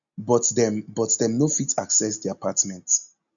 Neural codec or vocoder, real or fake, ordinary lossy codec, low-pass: none; real; none; 7.2 kHz